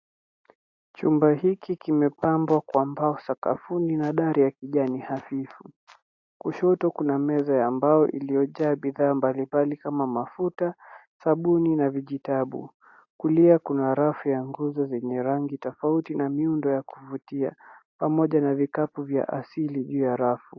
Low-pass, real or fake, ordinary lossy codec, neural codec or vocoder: 7.2 kHz; real; AAC, 48 kbps; none